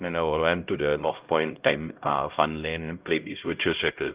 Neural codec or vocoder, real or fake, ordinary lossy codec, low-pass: codec, 16 kHz, 0.5 kbps, X-Codec, HuBERT features, trained on LibriSpeech; fake; Opus, 24 kbps; 3.6 kHz